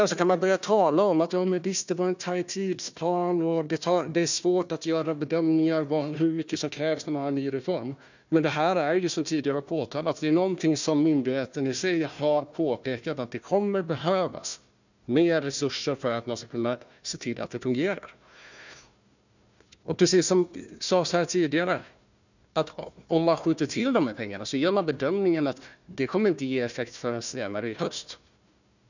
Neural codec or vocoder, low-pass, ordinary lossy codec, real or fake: codec, 16 kHz, 1 kbps, FunCodec, trained on Chinese and English, 50 frames a second; 7.2 kHz; none; fake